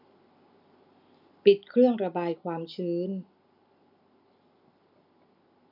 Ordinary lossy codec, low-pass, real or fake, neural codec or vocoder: none; 5.4 kHz; real; none